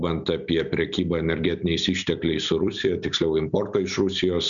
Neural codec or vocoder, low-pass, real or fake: none; 7.2 kHz; real